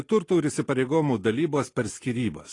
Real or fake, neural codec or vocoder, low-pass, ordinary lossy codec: real; none; 10.8 kHz; AAC, 32 kbps